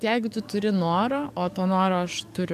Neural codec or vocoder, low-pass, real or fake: codec, 44.1 kHz, 7.8 kbps, DAC; 14.4 kHz; fake